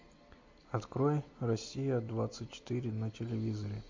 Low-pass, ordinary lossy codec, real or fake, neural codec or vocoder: 7.2 kHz; MP3, 48 kbps; real; none